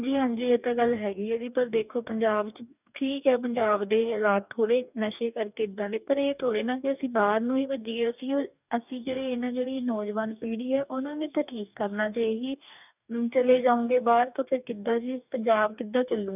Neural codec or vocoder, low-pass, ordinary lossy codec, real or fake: codec, 44.1 kHz, 2.6 kbps, DAC; 3.6 kHz; none; fake